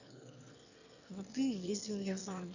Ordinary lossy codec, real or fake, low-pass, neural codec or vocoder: none; fake; 7.2 kHz; autoencoder, 22.05 kHz, a latent of 192 numbers a frame, VITS, trained on one speaker